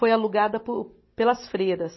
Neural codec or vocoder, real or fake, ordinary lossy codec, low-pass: none; real; MP3, 24 kbps; 7.2 kHz